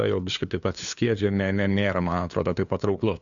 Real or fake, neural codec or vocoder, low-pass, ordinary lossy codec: fake; codec, 16 kHz, 2 kbps, FunCodec, trained on LibriTTS, 25 frames a second; 7.2 kHz; Opus, 64 kbps